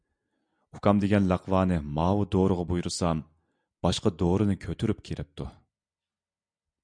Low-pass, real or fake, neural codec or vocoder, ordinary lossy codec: 9.9 kHz; real; none; MP3, 96 kbps